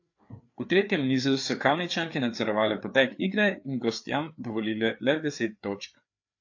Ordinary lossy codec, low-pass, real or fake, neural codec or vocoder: AAC, 48 kbps; 7.2 kHz; fake; codec, 16 kHz, 4 kbps, FreqCodec, larger model